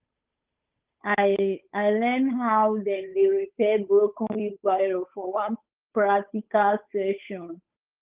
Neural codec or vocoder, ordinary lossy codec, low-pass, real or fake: codec, 16 kHz, 8 kbps, FunCodec, trained on Chinese and English, 25 frames a second; Opus, 32 kbps; 3.6 kHz; fake